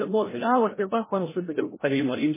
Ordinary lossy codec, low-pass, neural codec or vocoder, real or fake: MP3, 16 kbps; 3.6 kHz; codec, 16 kHz, 0.5 kbps, FreqCodec, larger model; fake